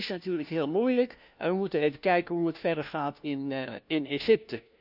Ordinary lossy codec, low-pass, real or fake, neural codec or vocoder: none; 5.4 kHz; fake; codec, 16 kHz, 1 kbps, FunCodec, trained on LibriTTS, 50 frames a second